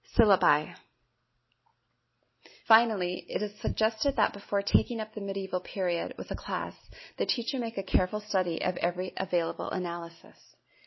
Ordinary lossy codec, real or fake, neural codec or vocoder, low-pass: MP3, 24 kbps; real; none; 7.2 kHz